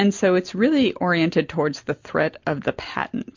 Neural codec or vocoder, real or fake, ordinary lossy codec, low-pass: none; real; MP3, 48 kbps; 7.2 kHz